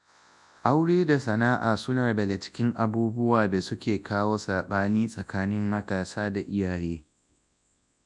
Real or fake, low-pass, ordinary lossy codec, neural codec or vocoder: fake; 10.8 kHz; none; codec, 24 kHz, 0.9 kbps, WavTokenizer, large speech release